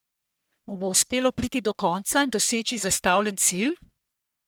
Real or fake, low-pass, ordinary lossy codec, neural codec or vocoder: fake; none; none; codec, 44.1 kHz, 1.7 kbps, Pupu-Codec